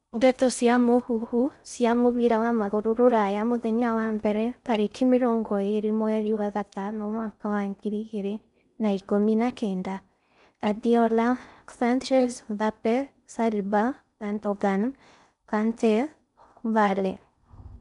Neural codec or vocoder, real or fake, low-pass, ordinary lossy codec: codec, 16 kHz in and 24 kHz out, 0.6 kbps, FocalCodec, streaming, 2048 codes; fake; 10.8 kHz; none